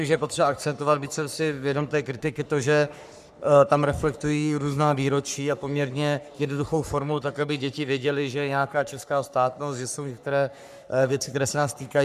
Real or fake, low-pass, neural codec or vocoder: fake; 14.4 kHz; codec, 44.1 kHz, 3.4 kbps, Pupu-Codec